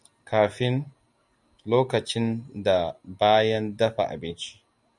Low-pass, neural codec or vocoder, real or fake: 10.8 kHz; none; real